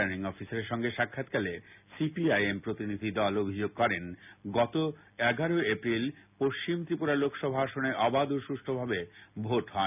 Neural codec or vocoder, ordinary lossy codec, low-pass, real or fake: none; none; 3.6 kHz; real